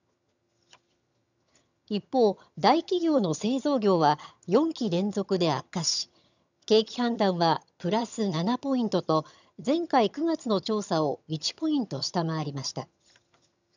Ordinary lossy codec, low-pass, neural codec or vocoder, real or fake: none; 7.2 kHz; vocoder, 22.05 kHz, 80 mel bands, HiFi-GAN; fake